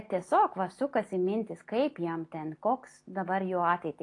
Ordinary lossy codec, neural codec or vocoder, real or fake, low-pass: AAC, 48 kbps; none; real; 10.8 kHz